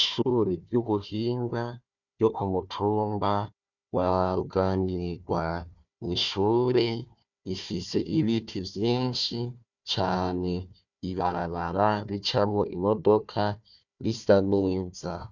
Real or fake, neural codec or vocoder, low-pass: fake; codec, 16 kHz, 1 kbps, FunCodec, trained on Chinese and English, 50 frames a second; 7.2 kHz